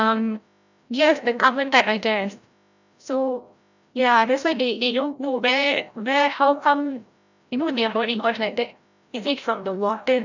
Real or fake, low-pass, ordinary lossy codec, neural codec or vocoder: fake; 7.2 kHz; none; codec, 16 kHz, 0.5 kbps, FreqCodec, larger model